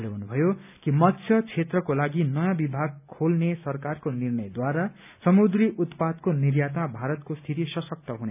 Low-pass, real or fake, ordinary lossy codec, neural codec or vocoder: 3.6 kHz; real; none; none